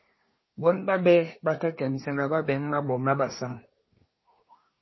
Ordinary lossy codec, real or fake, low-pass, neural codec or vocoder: MP3, 24 kbps; fake; 7.2 kHz; codec, 24 kHz, 1 kbps, SNAC